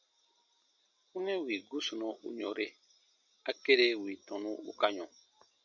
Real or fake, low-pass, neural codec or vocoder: real; 7.2 kHz; none